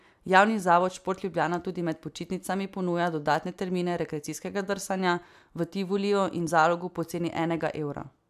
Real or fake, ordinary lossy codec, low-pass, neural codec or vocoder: real; AAC, 96 kbps; 14.4 kHz; none